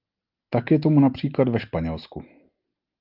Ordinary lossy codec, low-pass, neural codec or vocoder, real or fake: Opus, 24 kbps; 5.4 kHz; none; real